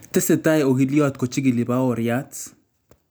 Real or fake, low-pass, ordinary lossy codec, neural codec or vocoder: real; none; none; none